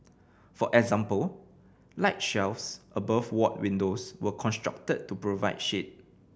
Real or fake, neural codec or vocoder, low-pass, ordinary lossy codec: real; none; none; none